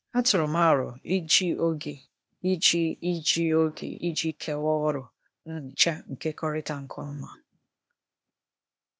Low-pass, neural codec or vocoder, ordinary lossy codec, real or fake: none; codec, 16 kHz, 0.8 kbps, ZipCodec; none; fake